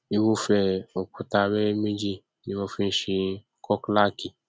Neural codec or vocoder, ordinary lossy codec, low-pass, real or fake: none; none; none; real